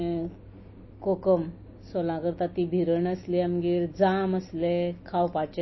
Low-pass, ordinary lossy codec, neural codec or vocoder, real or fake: 7.2 kHz; MP3, 24 kbps; none; real